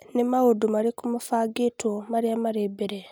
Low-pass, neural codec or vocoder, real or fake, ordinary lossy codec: none; none; real; none